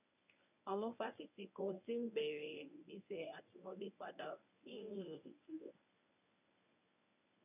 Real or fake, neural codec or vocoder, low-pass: fake; codec, 24 kHz, 0.9 kbps, WavTokenizer, medium speech release version 1; 3.6 kHz